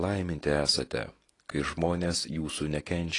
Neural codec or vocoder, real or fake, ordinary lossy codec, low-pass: none; real; AAC, 32 kbps; 10.8 kHz